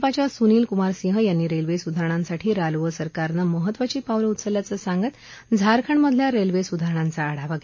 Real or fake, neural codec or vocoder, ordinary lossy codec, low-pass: real; none; MP3, 32 kbps; 7.2 kHz